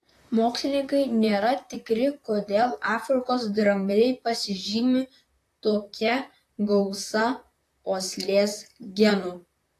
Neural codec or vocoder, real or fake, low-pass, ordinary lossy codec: vocoder, 44.1 kHz, 128 mel bands, Pupu-Vocoder; fake; 14.4 kHz; AAC, 64 kbps